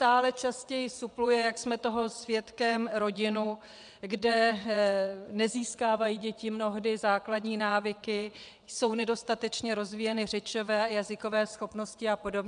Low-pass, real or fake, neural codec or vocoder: 9.9 kHz; fake; vocoder, 22.05 kHz, 80 mel bands, WaveNeXt